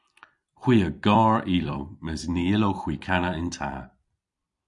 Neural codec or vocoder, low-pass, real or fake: vocoder, 44.1 kHz, 128 mel bands every 256 samples, BigVGAN v2; 10.8 kHz; fake